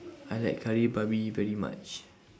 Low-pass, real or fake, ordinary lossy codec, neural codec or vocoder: none; real; none; none